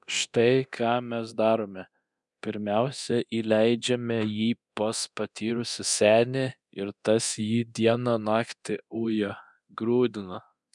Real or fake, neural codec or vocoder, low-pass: fake; codec, 24 kHz, 0.9 kbps, DualCodec; 10.8 kHz